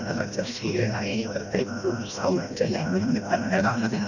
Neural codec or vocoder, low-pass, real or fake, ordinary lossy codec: codec, 16 kHz, 1 kbps, FreqCodec, smaller model; 7.2 kHz; fake; none